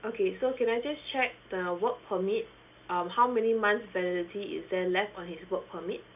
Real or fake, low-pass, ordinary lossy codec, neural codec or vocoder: real; 3.6 kHz; none; none